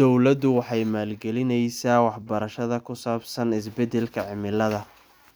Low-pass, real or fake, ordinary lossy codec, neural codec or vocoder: none; real; none; none